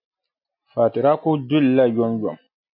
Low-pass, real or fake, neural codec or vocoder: 5.4 kHz; real; none